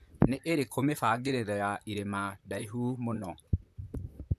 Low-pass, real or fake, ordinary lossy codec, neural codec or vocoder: 14.4 kHz; fake; none; vocoder, 44.1 kHz, 128 mel bands, Pupu-Vocoder